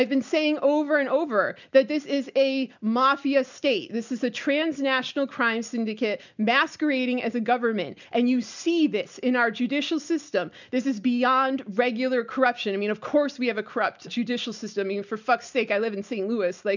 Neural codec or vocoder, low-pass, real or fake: none; 7.2 kHz; real